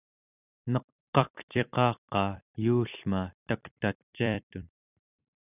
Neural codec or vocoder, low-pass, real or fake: vocoder, 44.1 kHz, 128 mel bands every 256 samples, BigVGAN v2; 3.6 kHz; fake